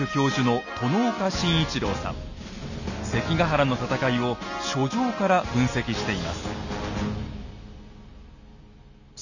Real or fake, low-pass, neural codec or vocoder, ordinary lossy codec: real; 7.2 kHz; none; none